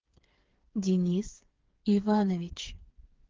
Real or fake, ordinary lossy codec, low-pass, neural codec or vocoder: fake; Opus, 16 kbps; 7.2 kHz; codec, 16 kHz, 4 kbps, FreqCodec, smaller model